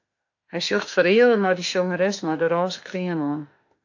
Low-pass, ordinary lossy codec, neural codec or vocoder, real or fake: 7.2 kHz; AAC, 48 kbps; autoencoder, 48 kHz, 32 numbers a frame, DAC-VAE, trained on Japanese speech; fake